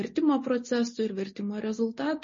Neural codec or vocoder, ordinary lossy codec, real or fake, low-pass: none; MP3, 32 kbps; real; 7.2 kHz